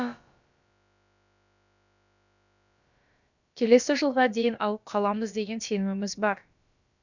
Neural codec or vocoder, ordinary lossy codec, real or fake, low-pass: codec, 16 kHz, about 1 kbps, DyCAST, with the encoder's durations; none; fake; 7.2 kHz